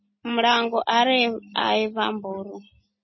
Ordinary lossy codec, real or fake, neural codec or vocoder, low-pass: MP3, 24 kbps; real; none; 7.2 kHz